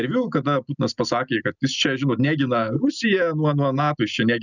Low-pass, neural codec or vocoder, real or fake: 7.2 kHz; none; real